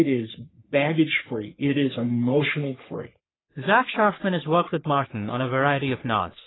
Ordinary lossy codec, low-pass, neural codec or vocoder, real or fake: AAC, 16 kbps; 7.2 kHz; codec, 44.1 kHz, 3.4 kbps, Pupu-Codec; fake